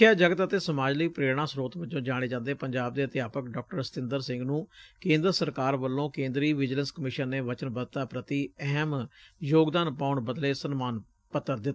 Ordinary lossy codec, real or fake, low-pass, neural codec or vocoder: none; real; none; none